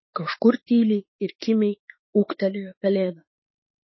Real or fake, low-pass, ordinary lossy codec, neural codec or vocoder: fake; 7.2 kHz; MP3, 24 kbps; autoencoder, 48 kHz, 32 numbers a frame, DAC-VAE, trained on Japanese speech